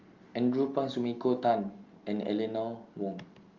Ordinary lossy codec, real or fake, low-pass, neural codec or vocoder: Opus, 32 kbps; real; 7.2 kHz; none